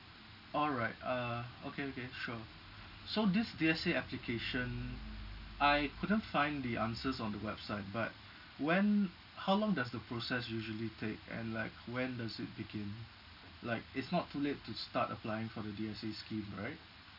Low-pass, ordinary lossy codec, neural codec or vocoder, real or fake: 5.4 kHz; none; none; real